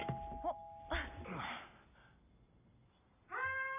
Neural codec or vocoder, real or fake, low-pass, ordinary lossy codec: none; real; 3.6 kHz; none